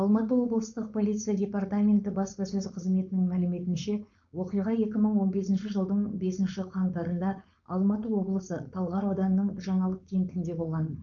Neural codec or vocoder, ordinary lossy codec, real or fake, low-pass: codec, 16 kHz, 4.8 kbps, FACodec; none; fake; 7.2 kHz